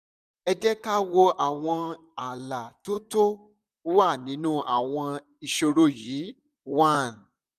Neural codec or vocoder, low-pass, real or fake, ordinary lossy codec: vocoder, 44.1 kHz, 128 mel bands, Pupu-Vocoder; 14.4 kHz; fake; none